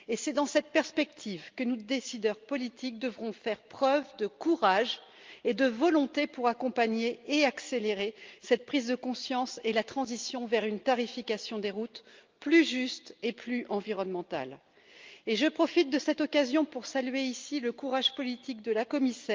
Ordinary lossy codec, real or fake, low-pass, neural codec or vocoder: Opus, 32 kbps; real; 7.2 kHz; none